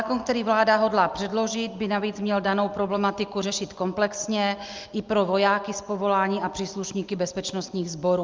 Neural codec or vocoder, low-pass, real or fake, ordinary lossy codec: none; 7.2 kHz; real; Opus, 24 kbps